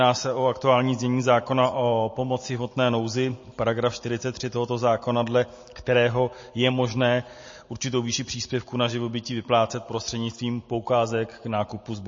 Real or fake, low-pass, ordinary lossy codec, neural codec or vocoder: real; 7.2 kHz; MP3, 32 kbps; none